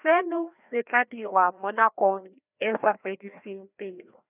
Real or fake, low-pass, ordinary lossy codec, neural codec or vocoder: fake; 3.6 kHz; none; codec, 16 kHz, 1 kbps, FreqCodec, larger model